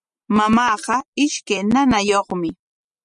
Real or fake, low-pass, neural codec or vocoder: real; 10.8 kHz; none